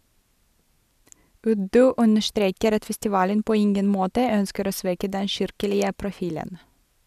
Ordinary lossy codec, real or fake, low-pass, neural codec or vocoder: none; real; 14.4 kHz; none